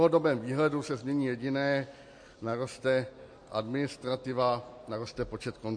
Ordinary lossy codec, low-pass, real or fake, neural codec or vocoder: MP3, 48 kbps; 9.9 kHz; real; none